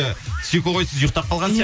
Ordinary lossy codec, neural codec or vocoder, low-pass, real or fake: none; none; none; real